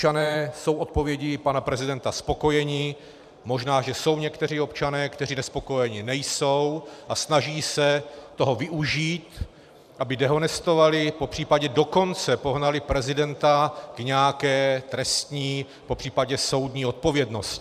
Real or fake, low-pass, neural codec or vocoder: fake; 14.4 kHz; vocoder, 44.1 kHz, 128 mel bands every 512 samples, BigVGAN v2